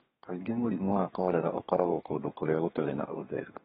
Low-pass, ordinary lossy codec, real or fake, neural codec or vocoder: 14.4 kHz; AAC, 16 kbps; fake; codec, 32 kHz, 1.9 kbps, SNAC